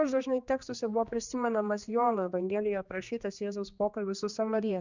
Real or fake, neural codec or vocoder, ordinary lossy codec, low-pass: fake; codec, 16 kHz, 2 kbps, X-Codec, HuBERT features, trained on general audio; Opus, 64 kbps; 7.2 kHz